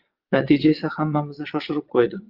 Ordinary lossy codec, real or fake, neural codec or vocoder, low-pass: Opus, 32 kbps; fake; vocoder, 22.05 kHz, 80 mel bands, WaveNeXt; 5.4 kHz